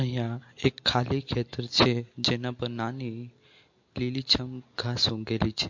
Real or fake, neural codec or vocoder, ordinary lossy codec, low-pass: real; none; MP3, 48 kbps; 7.2 kHz